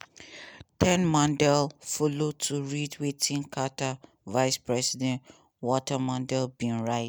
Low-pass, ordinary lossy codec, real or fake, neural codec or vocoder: none; none; real; none